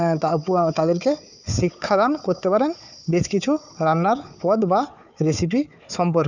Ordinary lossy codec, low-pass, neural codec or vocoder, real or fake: none; 7.2 kHz; codec, 16 kHz, 4 kbps, FunCodec, trained on Chinese and English, 50 frames a second; fake